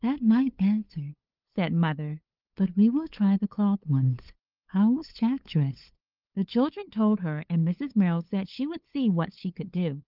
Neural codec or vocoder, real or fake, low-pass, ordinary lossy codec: codec, 16 kHz, 8 kbps, FunCodec, trained on LibriTTS, 25 frames a second; fake; 5.4 kHz; Opus, 32 kbps